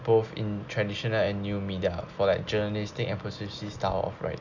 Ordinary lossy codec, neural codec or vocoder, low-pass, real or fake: none; none; 7.2 kHz; real